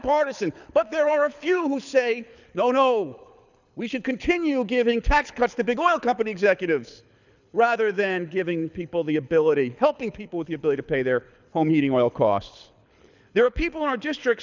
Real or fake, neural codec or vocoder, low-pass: fake; codec, 24 kHz, 6 kbps, HILCodec; 7.2 kHz